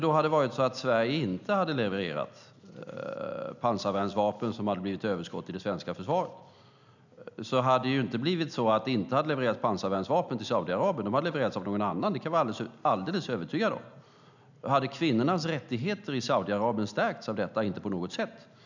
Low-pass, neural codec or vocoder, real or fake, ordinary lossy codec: 7.2 kHz; none; real; none